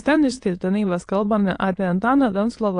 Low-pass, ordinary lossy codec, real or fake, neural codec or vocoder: 9.9 kHz; AAC, 48 kbps; fake; autoencoder, 22.05 kHz, a latent of 192 numbers a frame, VITS, trained on many speakers